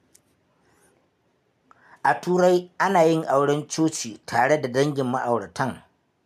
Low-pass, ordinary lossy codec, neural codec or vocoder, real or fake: 14.4 kHz; MP3, 96 kbps; none; real